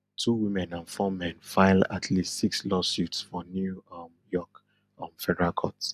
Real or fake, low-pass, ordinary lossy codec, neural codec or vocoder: real; 14.4 kHz; none; none